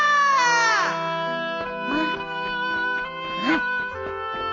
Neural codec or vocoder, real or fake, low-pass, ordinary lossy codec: none; real; 7.2 kHz; AAC, 32 kbps